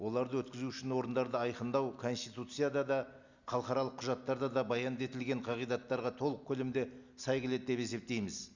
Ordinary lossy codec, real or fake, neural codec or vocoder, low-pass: Opus, 64 kbps; real; none; 7.2 kHz